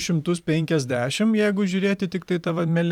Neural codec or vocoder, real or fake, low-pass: vocoder, 44.1 kHz, 128 mel bands every 512 samples, BigVGAN v2; fake; 19.8 kHz